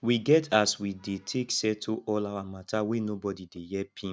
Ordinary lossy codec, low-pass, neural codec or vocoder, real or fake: none; none; none; real